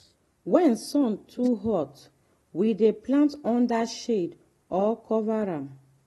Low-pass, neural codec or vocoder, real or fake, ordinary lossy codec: 19.8 kHz; none; real; AAC, 32 kbps